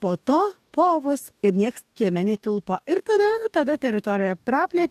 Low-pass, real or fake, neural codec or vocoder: 14.4 kHz; fake; codec, 44.1 kHz, 2.6 kbps, DAC